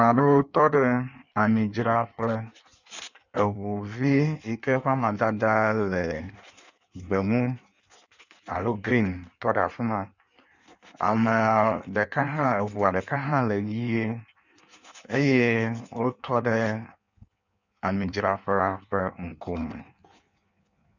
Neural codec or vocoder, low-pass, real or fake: codec, 16 kHz in and 24 kHz out, 1.1 kbps, FireRedTTS-2 codec; 7.2 kHz; fake